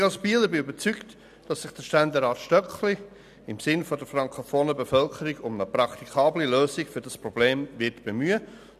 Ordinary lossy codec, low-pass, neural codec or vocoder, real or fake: none; 14.4 kHz; none; real